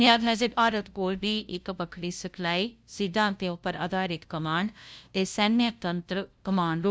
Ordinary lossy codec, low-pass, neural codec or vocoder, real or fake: none; none; codec, 16 kHz, 0.5 kbps, FunCodec, trained on LibriTTS, 25 frames a second; fake